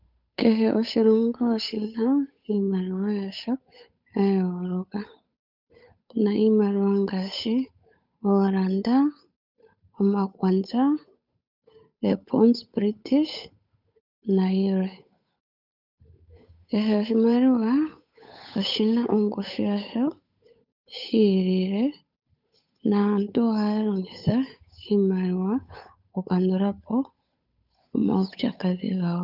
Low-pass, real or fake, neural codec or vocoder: 5.4 kHz; fake; codec, 16 kHz, 8 kbps, FunCodec, trained on Chinese and English, 25 frames a second